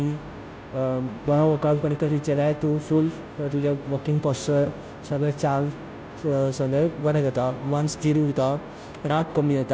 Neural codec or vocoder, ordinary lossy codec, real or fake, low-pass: codec, 16 kHz, 0.5 kbps, FunCodec, trained on Chinese and English, 25 frames a second; none; fake; none